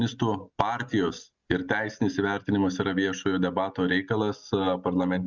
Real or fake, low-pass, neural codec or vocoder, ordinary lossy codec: real; 7.2 kHz; none; Opus, 64 kbps